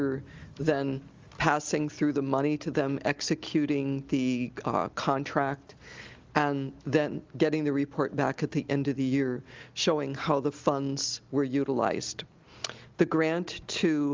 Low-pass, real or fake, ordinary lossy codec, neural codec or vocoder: 7.2 kHz; real; Opus, 32 kbps; none